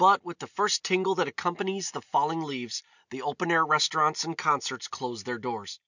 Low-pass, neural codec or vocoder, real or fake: 7.2 kHz; none; real